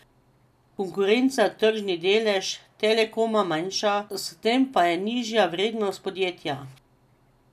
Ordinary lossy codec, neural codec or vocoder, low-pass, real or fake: none; none; 14.4 kHz; real